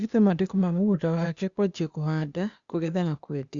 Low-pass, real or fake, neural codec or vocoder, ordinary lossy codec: 7.2 kHz; fake; codec, 16 kHz, 0.8 kbps, ZipCodec; Opus, 64 kbps